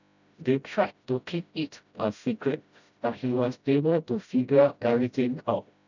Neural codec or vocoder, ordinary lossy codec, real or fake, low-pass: codec, 16 kHz, 0.5 kbps, FreqCodec, smaller model; none; fake; 7.2 kHz